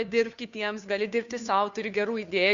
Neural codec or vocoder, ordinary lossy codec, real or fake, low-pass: codec, 16 kHz, 2 kbps, FunCodec, trained on Chinese and English, 25 frames a second; Opus, 64 kbps; fake; 7.2 kHz